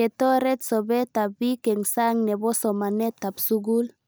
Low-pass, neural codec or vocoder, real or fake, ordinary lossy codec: none; none; real; none